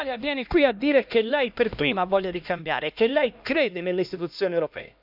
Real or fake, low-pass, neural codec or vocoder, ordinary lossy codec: fake; 5.4 kHz; codec, 16 kHz, 1 kbps, X-Codec, HuBERT features, trained on LibriSpeech; none